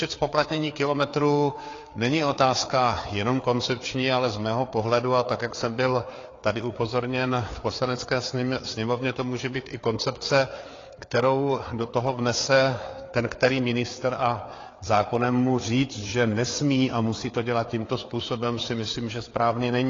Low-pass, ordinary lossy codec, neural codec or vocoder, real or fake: 7.2 kHz; AAC, 32 kbps; codec, 16 kHz, 4 kbps, FreqCodec, larger model; fake